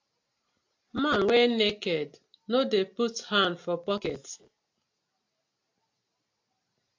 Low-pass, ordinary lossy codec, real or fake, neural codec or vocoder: 7.2 kHz; AAC, 48 kbps; real; none